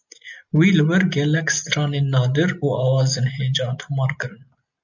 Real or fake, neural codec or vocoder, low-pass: real; none; 7.2 kHz